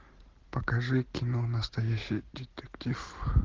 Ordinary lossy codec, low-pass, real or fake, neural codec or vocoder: Opus, 16 kbps; 7.2 kHz; real; none